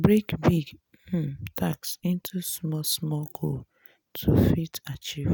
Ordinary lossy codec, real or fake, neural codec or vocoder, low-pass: none; real; none; none